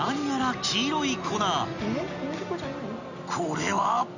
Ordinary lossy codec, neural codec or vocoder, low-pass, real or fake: none; none; 7.2 kHz; real